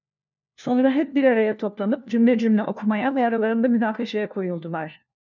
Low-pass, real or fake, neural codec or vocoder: 7.2 kHz; fake; codec, 16 kHz, 1 kbps, FunCodec, trained on LibriTTS, 50 frames a second